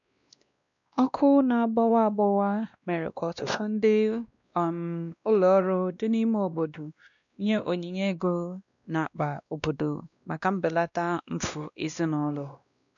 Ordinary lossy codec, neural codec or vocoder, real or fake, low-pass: none; codec, 16 kHz, 1 kbps, X-Codec, WavLM features, trained on Multilingual LibriSpeech; fake; 7.2 kHz